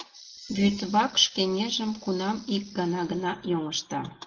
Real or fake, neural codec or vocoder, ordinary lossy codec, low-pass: real; none; Opus, 16 kbps; 7.2 kHz